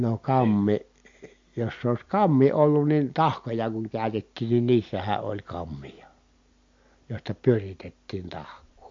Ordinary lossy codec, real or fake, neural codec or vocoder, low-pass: MP3, 48 kbps; real; none; 7.2 kHz